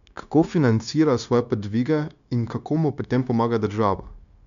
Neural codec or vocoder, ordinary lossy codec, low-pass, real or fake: codec, 16 kHz, 0.9 kbps, LongCat-Audio-Codec; none; 7.2 kHz; fake